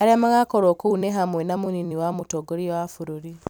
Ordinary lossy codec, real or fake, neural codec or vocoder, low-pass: none; fake; vocoder, 44.1 kHz, 128 mel bands every 256 samples, BigVGAN v2; none